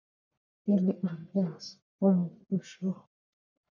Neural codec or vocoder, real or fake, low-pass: codec, 44.1 kHz, 1.7 kbps, Pupu-Codec; fake; 7.2 kHz